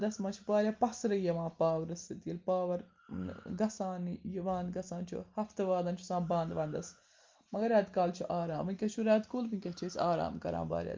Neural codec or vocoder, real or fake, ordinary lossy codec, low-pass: none; real; Opus, 32 kbps; 7.2 kHz